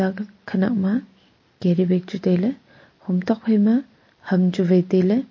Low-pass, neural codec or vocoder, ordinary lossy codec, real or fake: 7.2 kHz; none; MP3, 32 kbps; real